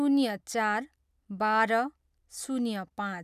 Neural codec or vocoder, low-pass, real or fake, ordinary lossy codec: none; 14.4 kHz; real; none